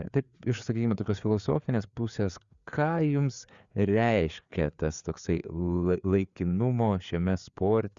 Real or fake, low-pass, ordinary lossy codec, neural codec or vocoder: fake; 7.2 kHz; Opus, 64 kbps; codec, 16 kHz, 4 kbps, FreqCodec, larger model